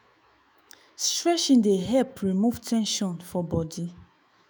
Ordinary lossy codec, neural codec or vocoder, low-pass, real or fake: none; autoencoder, 48 kHz, 128 numbers a frame, DAC-VAE, trained on Japanese speech; none; fake